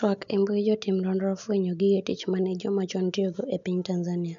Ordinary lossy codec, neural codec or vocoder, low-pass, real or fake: none; none; 7.2 kHz; real